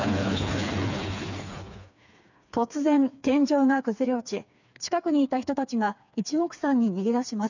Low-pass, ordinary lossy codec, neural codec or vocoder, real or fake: 7.2 kHz; none; codec, 16 kHz, 4 kbps, FreqCodec, smaller model; fake